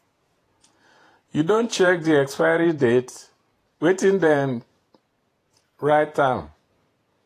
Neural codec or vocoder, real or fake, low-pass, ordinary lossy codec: vocoder, 48 kHz, 128 mel bands, Vocos; fake; 14.4 kHz; AAC, 48 kbps